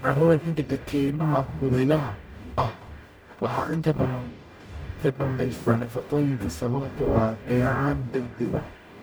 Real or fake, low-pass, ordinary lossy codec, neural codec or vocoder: fake; none; none; codec, 44.1 kHz, 0.9 kbps, DAC